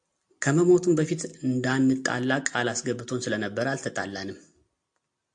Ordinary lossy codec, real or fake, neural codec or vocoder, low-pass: AAC, 64 kbps; real; none; 9.9 kHz